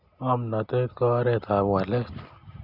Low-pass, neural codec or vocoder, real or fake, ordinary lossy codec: 5.4 kHz; none; real; Opus, 64 kbps